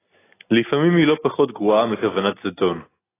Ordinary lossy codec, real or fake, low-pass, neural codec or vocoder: AAC, 16 kbps; real; 3.6 kHz; none